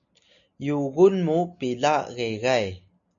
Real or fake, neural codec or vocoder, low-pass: real; none; 7.2 kHz